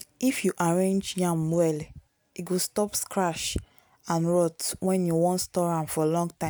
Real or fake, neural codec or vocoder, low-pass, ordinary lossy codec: real; none; none; none